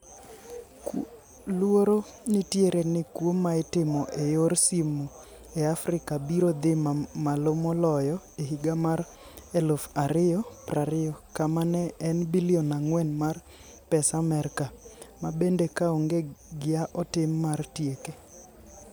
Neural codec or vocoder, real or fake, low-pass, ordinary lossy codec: none; real; none; none